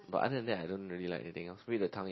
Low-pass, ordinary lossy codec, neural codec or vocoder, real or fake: 7.2 kHz; MP3, 24 kbps; none; real